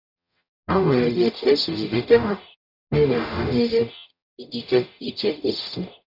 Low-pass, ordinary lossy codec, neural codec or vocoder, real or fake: 5.4 kHz; none; codec, 44.1 kHz, 0.9 kbps, DAC; fake